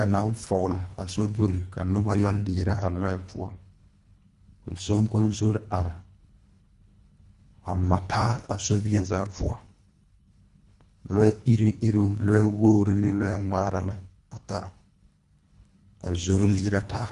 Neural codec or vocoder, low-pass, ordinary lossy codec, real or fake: codec, 24 kHz, 1.5 kbps, HILCodec; 10.8 kHz; Opus, 64 kbps; fake